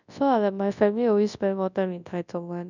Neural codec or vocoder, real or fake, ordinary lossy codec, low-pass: codec, 24 kHz, 0.9 kbps, WavTokenizer, large speech release; fake; none; 7.2 kHz